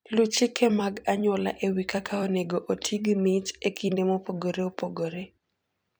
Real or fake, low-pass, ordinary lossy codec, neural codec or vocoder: fake; none; none; vocoder, 44.1 kHz, 128 mel bands every 256 samples, BigVGAN v2